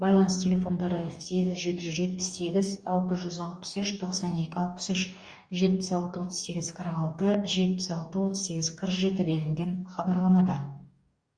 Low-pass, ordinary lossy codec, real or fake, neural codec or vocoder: 9.9 kHz; Opus, 64 kbps; fake; codec, 44.1 kHz, 2.6 kbps, DAC